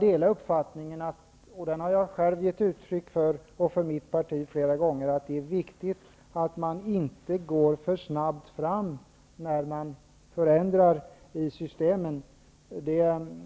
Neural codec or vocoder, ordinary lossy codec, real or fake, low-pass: none; none; real; none